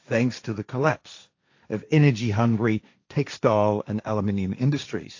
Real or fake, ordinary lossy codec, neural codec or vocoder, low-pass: fake; AAC, 48 kbps; codec, 16 kHz, 1.1 kbps, Voila-Tokenizer; 7.2 kHz